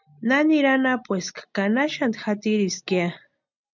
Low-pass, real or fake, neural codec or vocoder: 7.2 kHz; real; none